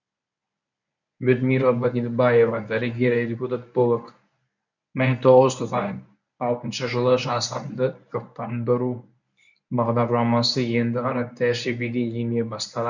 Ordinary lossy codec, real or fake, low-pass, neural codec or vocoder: none; fake; 7.2 kHz; codec, 24 kHz, 0.9 kbps, WavTokenizer, medium speech release version 1